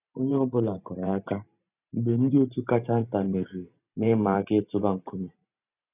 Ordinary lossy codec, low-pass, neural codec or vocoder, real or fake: none; 3.6 kHz; vocoder, 44.1 kHz, 128 mel bands every 512 samples, BigVGAN v2; fake